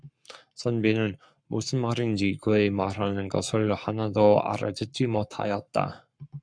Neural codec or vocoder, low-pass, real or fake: codec, 44.1 kHz, 7.8 kbps, Pupu-Codec; 9.9 kHz; fake